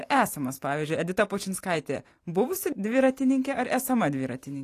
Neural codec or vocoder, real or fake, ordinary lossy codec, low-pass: vocoder, 44.1 kHz, 128 mel bands every 512 samples, BigVGAN v2; fake; AAC, 48 kbps; 14.4 kHz